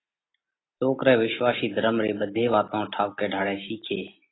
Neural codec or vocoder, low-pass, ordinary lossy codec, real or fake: none; 7.2 kHz; AAC, 16 kbps; real